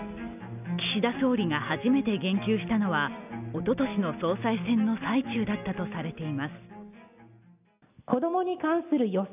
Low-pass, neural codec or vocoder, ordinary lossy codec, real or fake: 3.6 kHz; none; none; real